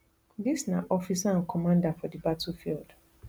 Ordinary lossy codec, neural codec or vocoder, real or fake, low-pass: none; none; real; none